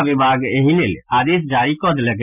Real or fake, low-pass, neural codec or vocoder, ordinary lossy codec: real; 3.6 kHz; none; none